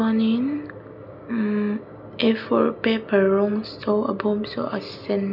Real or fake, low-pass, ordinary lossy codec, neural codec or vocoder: real; 5.4 kHz; none; none